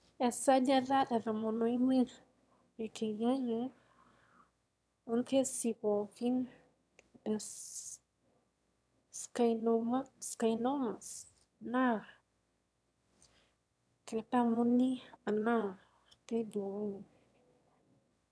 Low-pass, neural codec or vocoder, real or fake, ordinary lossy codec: none; autoencoder, 22.05 kHz, a latent of 192 numbers a frame, VITS, trained on one speaker; fake; none